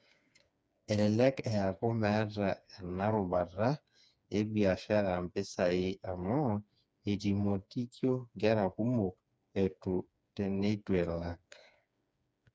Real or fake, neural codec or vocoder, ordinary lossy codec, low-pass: fake; codec, 16 kHz, 4 kbps, FreqCodec, smaller model; none; none